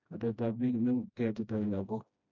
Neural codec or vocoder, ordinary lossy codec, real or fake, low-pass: codec, 16 kHz, 1 kbps, FreqCodec, smaller model; MP3, 64 kbps; fake; 7.2 kHz